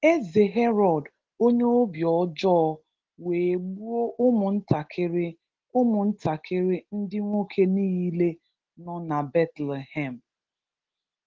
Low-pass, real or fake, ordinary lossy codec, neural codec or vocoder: 7.2 kHz; real; Opus, 16 kbps; none